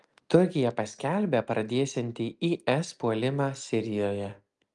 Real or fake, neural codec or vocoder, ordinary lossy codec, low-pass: real; none; Opus, 32 kbps; 10.8 kHz